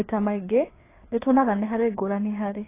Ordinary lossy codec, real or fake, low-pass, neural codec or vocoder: AAC, 16 kbps; fake; 3.6 kHz; codec, 16 kHz, 4 kbps, FreqCodec, larger model